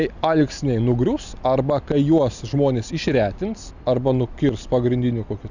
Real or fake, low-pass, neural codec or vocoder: real; 7.2 kHz; none